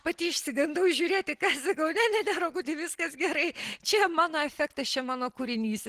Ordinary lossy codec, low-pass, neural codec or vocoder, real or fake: Opus, 16 kbps; 14.4 kHz; none; real